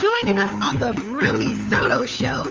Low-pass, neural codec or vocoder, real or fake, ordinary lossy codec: 7.2 kHz; codec, 16 kHz, 4 kbps, FunCodec, trained on LibriTTS, 50 frames a second; fake; Opus, 32 kbps